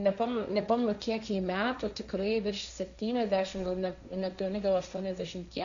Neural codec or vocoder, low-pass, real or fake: codec, 16 kHz, 1.1 kbps, Voila-Tokenizer; 7.2 kHz; fake